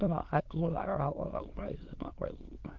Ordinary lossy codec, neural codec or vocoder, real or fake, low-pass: Opus, 16 kbps; autoencoder, 22.05 kHz, a latent of 192 numbers a frame, VITS, trained on many speakers; fake; 7.2 kHz